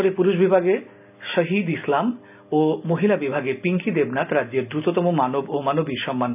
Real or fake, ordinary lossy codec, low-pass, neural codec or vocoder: real; none; 3.6 kHz; none